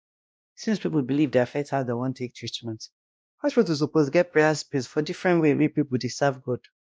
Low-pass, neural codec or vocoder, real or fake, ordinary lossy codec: none; codec, 16 kHz, 1 kbps, X-Codec, WavLM features, trained on Multilingual LibriSpeech; fake; none